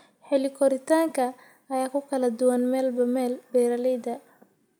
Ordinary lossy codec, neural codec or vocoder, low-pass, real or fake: none; none; none; real